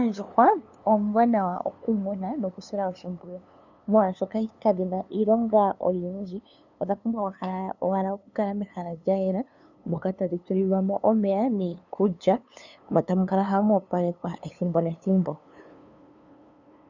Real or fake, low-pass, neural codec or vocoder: fake; 7.2 kHz; codec, 16 kHz, 2 kbps, FunCodec, trained on LibriTTS, 25 frames a second